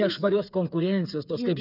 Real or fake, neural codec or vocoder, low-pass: fake; codec, 16 kHz, 8 kbps, FreqCodec, smaller model; 5.4 kHz